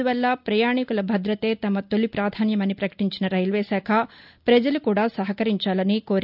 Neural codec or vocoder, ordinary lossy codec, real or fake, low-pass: none; none; real; 5.4 kHz